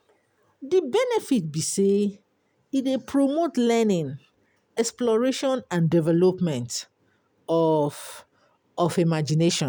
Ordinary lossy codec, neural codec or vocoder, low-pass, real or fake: none; none; none; real